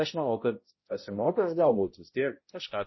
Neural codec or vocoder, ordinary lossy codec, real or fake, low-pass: codec, 16 kHz, 0.5 kbps, X-Codec, HuBERT features, trained on balanced general audio; MP3, 24 kbps; fake; 7.2 kHz